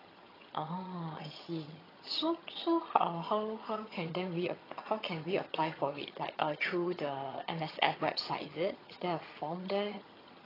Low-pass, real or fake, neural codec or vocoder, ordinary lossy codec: 5.4 kHz; fake; vocoder, 22.05 kHz, 80 mel bands, HiFi-GAN; AAC, 24 kbps